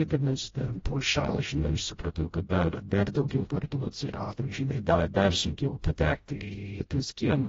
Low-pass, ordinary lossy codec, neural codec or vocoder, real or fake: 7.2 kHz; AAC, 24 kbps; codec, 16 kHz, 0.5 kbps, FreqCodec, smaller model; fake